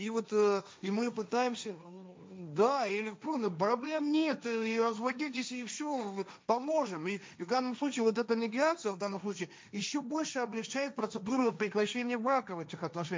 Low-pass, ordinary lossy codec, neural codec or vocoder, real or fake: none; none; codec, 16 kHz, 1.1 kbps, Voila-Tokenizer; fake